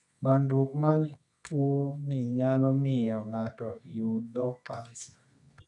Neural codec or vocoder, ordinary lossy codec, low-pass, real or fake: codec, 24 kHz, 0.9 kbps, WavTokenizer, medium music audio release; none; 10.8 kHz; fake